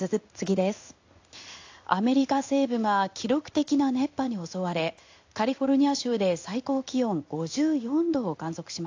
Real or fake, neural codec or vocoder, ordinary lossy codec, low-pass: fake; codec, 16 kHz in and 24 kHz out, 1 kbps, XY-Tokenizer; AAC, 48 kbps; 7.2 kHz